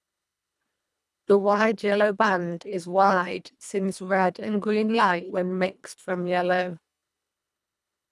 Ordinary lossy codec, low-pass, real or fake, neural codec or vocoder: none; none; fake; codec, 24 kHz, 1.5 kbps, HILCodec